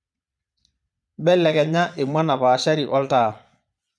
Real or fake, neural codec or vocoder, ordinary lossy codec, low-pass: fake; vocoder, 22.05 kHz, 80 mel bands, Vocos; none; none